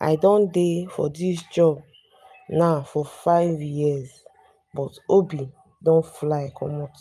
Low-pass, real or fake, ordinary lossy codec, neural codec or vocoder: 14.4 kHz; real; none; none